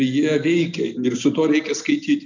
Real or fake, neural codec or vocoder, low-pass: real; none; 7.2 kHz